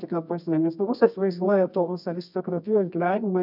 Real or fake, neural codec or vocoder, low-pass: fake; codec, 24 kHz, 0.9 kbps, WavTokenizer, medium music audio release; 5.4 kHz